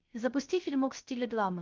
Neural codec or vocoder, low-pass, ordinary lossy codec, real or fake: codec, 16 kHz, 0.3 kbps, FocalCodec; 7.2 kHz; Opus, 32 kbps; fake